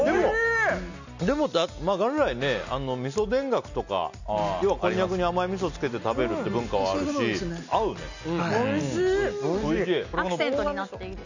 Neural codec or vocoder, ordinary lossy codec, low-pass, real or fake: none; none; 7.2 kHz; real